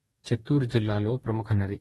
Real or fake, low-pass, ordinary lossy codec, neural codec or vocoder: fake; 19.8 kHz; AAC, 32 kbps; codec, 44.1 kHz, 2.6 kbps, DAC